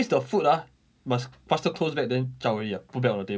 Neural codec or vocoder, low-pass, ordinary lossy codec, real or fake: none; none; none; real